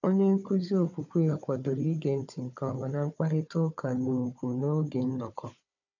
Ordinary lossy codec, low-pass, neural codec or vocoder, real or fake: none; 7.2 kHz; codec, 16 kHz, 4 kbps, FunCodec, trained on Chinese and English, 50 frames a second; fake